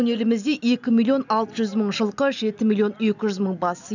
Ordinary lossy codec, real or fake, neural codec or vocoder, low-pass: none; real; none; 7.2 kHz